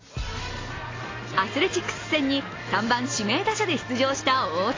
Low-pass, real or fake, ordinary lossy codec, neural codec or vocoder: 7.2 kHz; real; AAC, 32 kbps; none